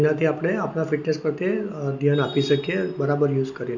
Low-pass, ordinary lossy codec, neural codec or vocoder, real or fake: 7.2 kHz; none; none; real